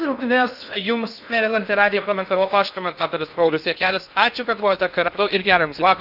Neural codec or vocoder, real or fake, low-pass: codec, 16 kHz in and 24 kHz out, 0.6 kbps, FocalCodec, streaming, 2048 codes; fake; 5.4 kHz